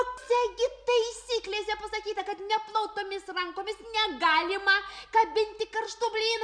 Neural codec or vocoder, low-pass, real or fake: none; 9.9 kHz; real